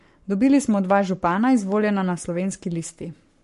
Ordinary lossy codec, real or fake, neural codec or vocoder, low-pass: MP3, 48 kbps; fake; codec, 44.1 kHz, 7.8 kbps, Pupu-Codec; 14.4 kHz